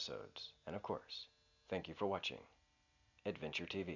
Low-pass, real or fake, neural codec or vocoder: 7.2 kHz; real; none